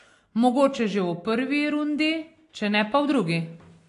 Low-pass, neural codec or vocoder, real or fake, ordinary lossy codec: 10.8 kHz; none; real; AAC, 48 kbps